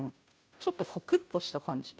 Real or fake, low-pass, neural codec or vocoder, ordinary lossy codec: fake; none; codec, 16 kHz, 0.5 kbps, FunCodec, trained on Chinese and English, 25 frames a second; none